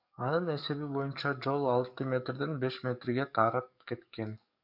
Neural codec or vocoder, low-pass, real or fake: codec, 44.1 kHz, 7.8 kbps, DAC; 5.4 kHz; fake